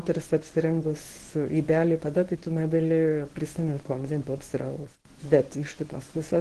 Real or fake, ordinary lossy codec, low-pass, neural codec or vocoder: fake; Opus, 16 kbps; 10.8 kHz; codec, 24 kHz, 0.9 kbps, WavTokenizer, medium speech release version 1